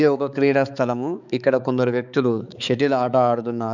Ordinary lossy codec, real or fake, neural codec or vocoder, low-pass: none; fake; codec, 16 kHz, 4 kbps, X-Codec, HuBERT features, trained on balanced general audio; 7.2 kHz